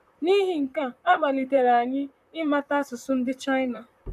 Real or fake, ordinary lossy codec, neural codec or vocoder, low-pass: fake; none; vocoder, 44.1 kHz, 128 mel bands, Pupu-Vocoder; 14.4 kHz